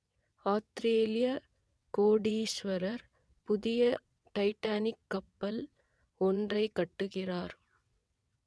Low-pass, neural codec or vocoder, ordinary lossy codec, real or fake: none; vocoder, 22.05 kHz, 80 mel bands, WaveNeXt; none; fake